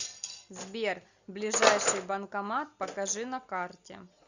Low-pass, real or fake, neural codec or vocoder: 7.2 kHz; real; none